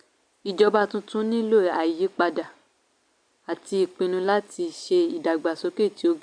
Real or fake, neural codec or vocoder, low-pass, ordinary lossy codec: real; none; 9.9 kHz; none